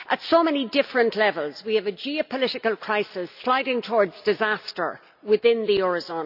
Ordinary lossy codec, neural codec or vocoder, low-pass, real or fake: none; none; 5.4 kHz; real